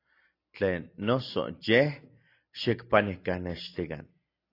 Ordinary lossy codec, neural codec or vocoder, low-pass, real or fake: AAC, 32 kbps; none; 5.4 kHz; real